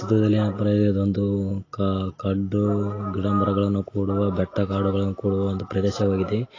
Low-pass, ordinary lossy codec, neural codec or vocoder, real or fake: 7.2 kHz; AAC, 32 kbps; none; real